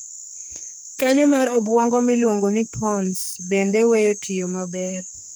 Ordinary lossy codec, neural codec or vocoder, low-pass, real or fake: none; codec, 44.1 kHz, 2.6 kbps, SNAC; none; fake